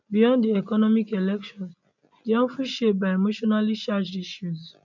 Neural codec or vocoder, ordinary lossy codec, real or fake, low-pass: none; none; real; 7.2 kHz